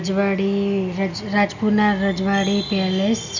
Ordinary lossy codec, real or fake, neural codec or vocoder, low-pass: none; real; none; 7.2 kHz